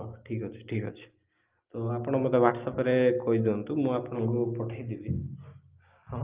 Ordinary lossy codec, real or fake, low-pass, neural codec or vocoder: Opus, 32 kbps; real; 3.6 kHz; none